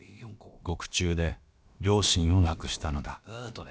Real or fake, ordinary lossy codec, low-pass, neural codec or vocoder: fake; none; none; codec, 16 kHz, about 1 kbps, DyCAST, with the encoder's durations